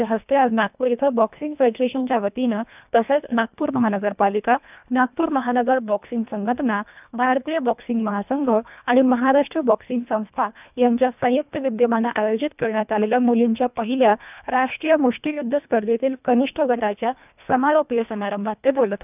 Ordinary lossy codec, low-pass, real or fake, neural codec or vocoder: none; 3.6 kHz; fake; codec, 24 kHz, 1.5 kbps, HILCodec